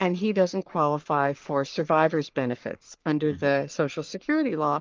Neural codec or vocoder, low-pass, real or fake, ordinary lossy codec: codec, 44.1 kHz, 3.4 kbps, Pupu-Codec; 7.2 kHz; fake; Opus, 32 kbps